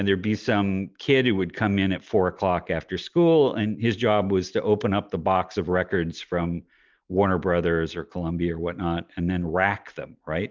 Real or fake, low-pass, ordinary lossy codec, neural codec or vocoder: real; 7.2 kHz; Opus, 32 kbps; none